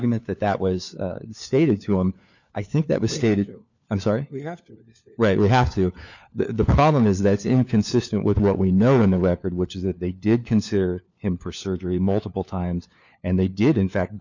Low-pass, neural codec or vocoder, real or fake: 7.2 kHz; codec, 16 kHz, 4 kbps, FunCodec, trained on LibriTTS, 50 frames a second; fake